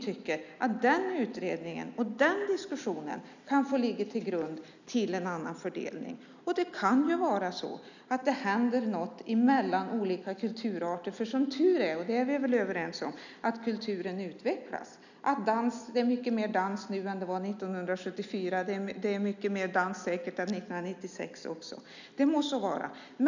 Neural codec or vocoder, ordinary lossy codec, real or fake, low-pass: none; none; real; 7.2 kHz